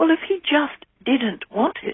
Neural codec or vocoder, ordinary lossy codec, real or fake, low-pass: none; AAC, 16 kbps; real; 7.2 kHz